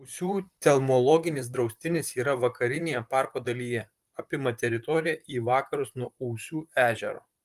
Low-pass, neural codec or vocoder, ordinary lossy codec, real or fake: 14.4 kHz; vocoder, 44.1 kHz, 128 mel bands, Pupu-Vocoder; Opus, 32 kbps; fake